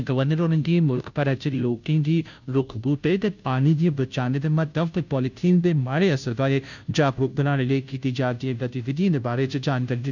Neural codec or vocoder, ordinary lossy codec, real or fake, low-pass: codec, 16 kHz, 0.5 kbps, FunCodec, trained on Chinese and English, 25 frames a second; none; fake; 7.2 kHz